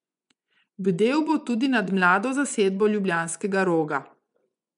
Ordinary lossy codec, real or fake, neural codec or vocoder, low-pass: none; fake; vocoder, 24 kHz, 100 mel bands, Vocos; 10.8 kHz